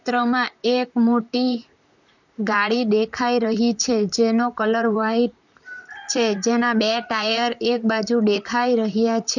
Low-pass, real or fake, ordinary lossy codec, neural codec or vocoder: 7.2 kHz; fake; none; vocoder, 44.1 kHz, 128 mel bands, Pupu-Vocoder